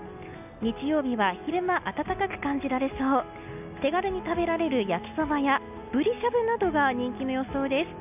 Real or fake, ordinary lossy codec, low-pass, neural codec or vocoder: real; none; 3.6 kHz; none